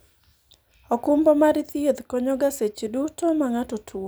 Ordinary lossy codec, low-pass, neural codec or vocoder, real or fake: none; none; none; real